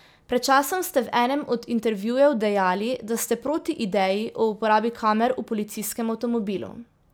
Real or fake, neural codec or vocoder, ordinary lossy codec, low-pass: real; none; none; none